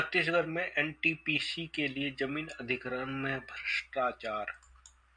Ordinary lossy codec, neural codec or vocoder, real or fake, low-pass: MP3, 64 kbps; none; real; 9.9 kHz